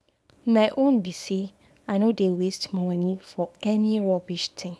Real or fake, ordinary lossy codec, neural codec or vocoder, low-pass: fake; none; codec, 24 kHz, 0.9 kbps, WavTokenizer, small release; none